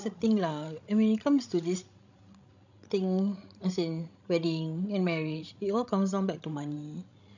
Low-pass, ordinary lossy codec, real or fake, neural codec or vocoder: 7.2 kHz; none; fake; codec, 16 kHz, 16 kbps, FreqCodec, larger model